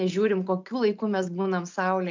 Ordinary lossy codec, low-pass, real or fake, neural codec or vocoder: MP3, 64 kbps; 7.2 kHz; real; none